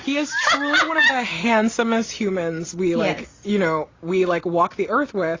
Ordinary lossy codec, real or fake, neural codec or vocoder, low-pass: AAC, 32 kbps; real; none; 7.2 kHz